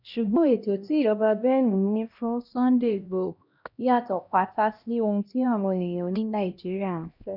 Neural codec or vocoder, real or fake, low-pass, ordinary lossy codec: codec, 16 kHz, 1 kbps, X-Codec, HuBERT features, trained on LibriSpeech; fake; 5.4 kHz; none